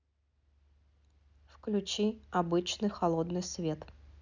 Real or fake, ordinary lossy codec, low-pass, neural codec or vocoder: real; none; 7.2 kHz; none